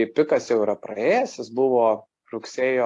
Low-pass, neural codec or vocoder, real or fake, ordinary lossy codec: 10.8 kHz; none; real; AAC, 48 kbps